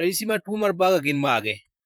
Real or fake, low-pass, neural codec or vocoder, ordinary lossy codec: fake; none; vocoder, 44.1 kHz, 128 mel bands, Pupu-Vocoder; none